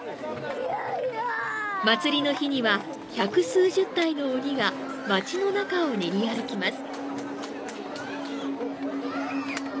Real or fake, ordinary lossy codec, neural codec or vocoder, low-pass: real; none; none; none